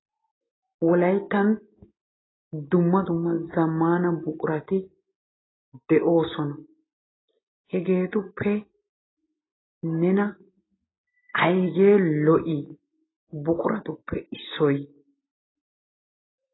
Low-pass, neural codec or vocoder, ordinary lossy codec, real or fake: 7.2 kHz; none; AAC, 16 kbps; real